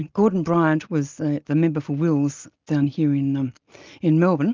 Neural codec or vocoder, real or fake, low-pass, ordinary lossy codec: none; real; 7.2 kHz; Opus, 32 kbps